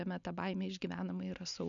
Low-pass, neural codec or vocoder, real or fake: 7.2 kHz; none; real